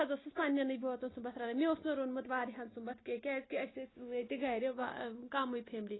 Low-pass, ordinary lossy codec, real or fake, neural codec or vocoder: 7.2 kHz; AAC, 16 kbps; real; none